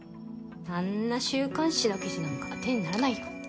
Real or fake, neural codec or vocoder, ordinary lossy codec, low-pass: real; none; none; none